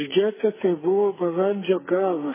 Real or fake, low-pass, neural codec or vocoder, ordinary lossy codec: fake; 3.6 kHz; codec, 44.1 kHz, 2.6 kbps, SNAC; MP3, 16 kbps